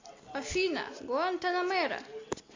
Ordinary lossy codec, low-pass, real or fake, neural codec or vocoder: MP3, 48 kbps; 7.2 kHz; real; none